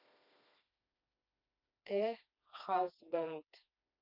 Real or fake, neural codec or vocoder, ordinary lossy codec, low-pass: fake; codec, 16 kHz, 2 kbps, FreqCodec, smaller model; none; 5.4 kHz